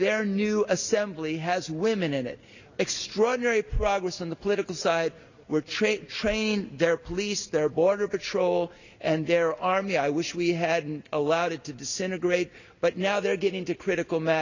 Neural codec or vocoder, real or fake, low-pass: none; real; 7.2 kHz